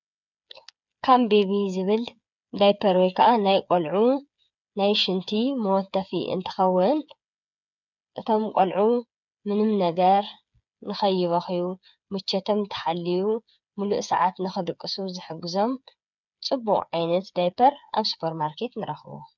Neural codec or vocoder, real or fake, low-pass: codec, 16 kHz, 8 kbps, FreqCodec, smaller model; fake; 7.2 kHz